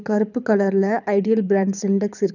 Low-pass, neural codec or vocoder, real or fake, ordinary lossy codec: 7.2 kHz; codec, 24 kHz, 6 kbps, HILCodec; fake; none